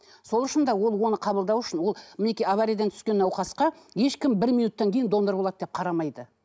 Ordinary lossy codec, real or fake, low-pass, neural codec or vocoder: none; real; none; none